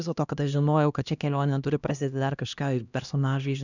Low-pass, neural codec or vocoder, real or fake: 7.2 kHz; codec, 16 kHz, 1 kbps, X-Codec, HuBERT features, trained on LibriSpeech; fake